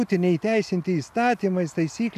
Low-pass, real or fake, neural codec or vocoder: 14.4 kHz; real; none